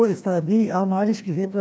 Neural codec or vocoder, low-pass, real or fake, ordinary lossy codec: codec, 16 kHz, 1 kbps, FreqCodec, larger model; none; fake; none